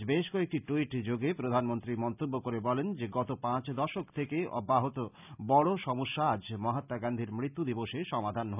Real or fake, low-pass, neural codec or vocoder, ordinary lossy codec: real; 3.6 kHz; none; none